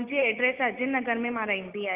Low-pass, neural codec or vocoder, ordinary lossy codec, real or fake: 3.6 kHz; none; Opus, 24 kbps; real